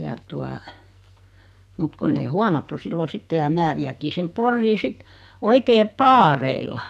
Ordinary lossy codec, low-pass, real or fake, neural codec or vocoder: none; 14.4 kHz; fake; codec, 44.1 kHz, 2.6 kbps, SNAC